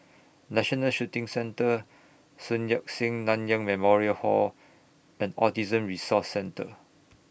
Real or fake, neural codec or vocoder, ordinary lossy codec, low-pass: real; none; none; none